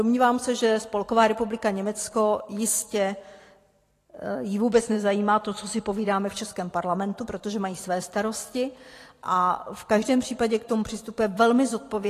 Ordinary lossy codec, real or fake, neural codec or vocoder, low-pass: AAC, 48 kbps; fake; vocoder, 44.1 kHz, 128 mel bands every 512 samples, BigVGAN v2; 14.4 kHz